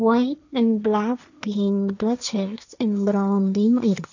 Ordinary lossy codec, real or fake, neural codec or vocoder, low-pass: none; fake; codec, 16 kHz, 1.1 kbps, Voila-Tokenizer; 7.2 kHz